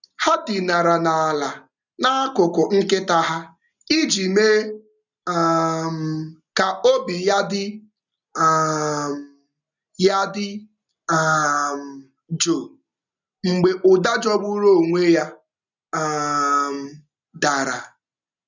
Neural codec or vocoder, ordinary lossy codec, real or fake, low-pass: none; none; real; 7.2 kHz